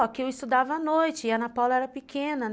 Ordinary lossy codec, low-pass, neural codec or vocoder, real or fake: none; none; none; real